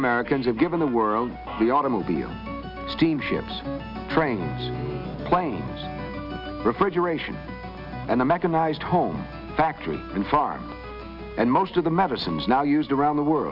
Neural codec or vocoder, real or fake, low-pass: none; real; 5.4 kHz